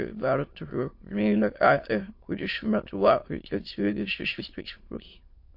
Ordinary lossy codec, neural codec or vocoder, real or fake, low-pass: MP3, 32 kbps; autoencoder, 22.05 kHz, a latent of 192 numbers a frame, VITS, trained on many speakers; fake; 5.4 kHz